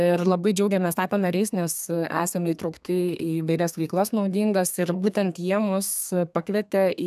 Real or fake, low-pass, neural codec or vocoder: fake; 14.4 kHz; codec, 32 kHz, 1.9 kbps, SNAC